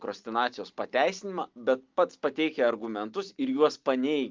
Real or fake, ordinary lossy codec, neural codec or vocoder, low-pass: real; Opus, 24 kbps; none; 7.2 kHz